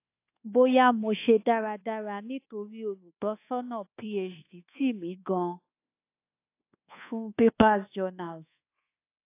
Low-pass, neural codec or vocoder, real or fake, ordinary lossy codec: 3.6 kHz; codec, 24 kHz, 1.2 kbps, DualCodec; fake; AAC, 24 kbps